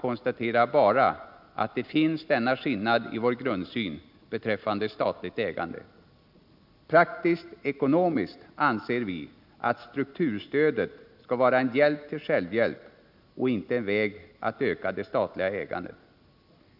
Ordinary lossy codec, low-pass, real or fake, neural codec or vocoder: none; 5.4 kHz; real; none